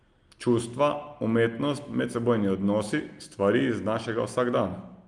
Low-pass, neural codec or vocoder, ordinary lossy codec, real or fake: 10.8 kHz; none; Opus, 32 kbps; real